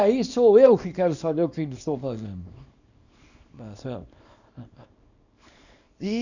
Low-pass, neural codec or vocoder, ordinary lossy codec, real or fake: 7.2 kHz; codec, 24 kHz, 0.9 kbps, WavTokenizer, small release; none; fake